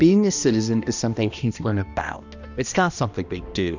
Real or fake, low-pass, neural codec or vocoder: fake; 7.2 kHz; codec, 16 kHz, 1 kbps, X-Codec, HuBERT features, trained on balanced general audio